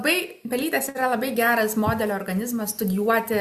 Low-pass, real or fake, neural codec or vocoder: 14.4 kHz; real; none